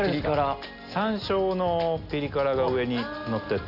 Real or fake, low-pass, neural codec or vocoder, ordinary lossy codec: real; 5.4 kHz; none; Opus, 64 kbps